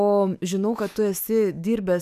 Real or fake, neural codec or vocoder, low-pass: fake; autoencoder, 48 kHz, 128 numbers a frame, DAC-VAE, trained on Japanese speech; 14.4 kHz